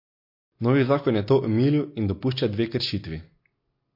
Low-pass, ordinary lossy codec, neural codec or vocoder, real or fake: 5.4 kHz; MP3, 32 kbps; none; real